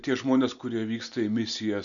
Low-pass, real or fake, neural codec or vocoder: 7.2 kHz; real; none